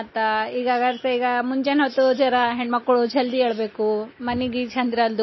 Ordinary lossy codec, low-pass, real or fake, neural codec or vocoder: MP3, 24 kbps; 7.2 kHz; real; none